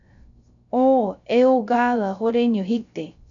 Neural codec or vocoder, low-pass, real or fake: codec, 16 kHz, 0.3 kbps, FocalCodec; 7.2 kHz; fake